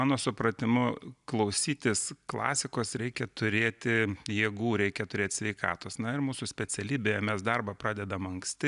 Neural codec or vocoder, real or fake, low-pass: none; real; 10.8 kHz